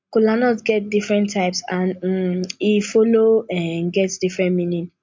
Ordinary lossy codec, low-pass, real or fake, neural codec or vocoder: MP3, 48 kbps; 7.2 kHz; real; none